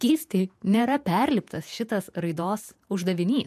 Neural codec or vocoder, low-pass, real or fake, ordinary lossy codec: vocoder, 48 kHz, 128 mel bands, Vocos; 14.4 kHz; fake; MP3, 96 kbps